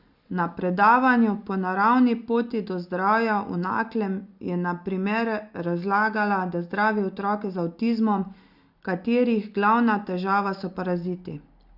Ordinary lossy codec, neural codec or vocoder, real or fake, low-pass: Opus, 64 kbps; none; real; 5.4 kHz